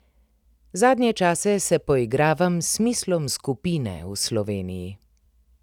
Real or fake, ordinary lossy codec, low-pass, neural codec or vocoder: fake; none; 19.8 kHz; vocoder, 44.1 kHz, 128 mel bands every 256 samples, BigVGAN v2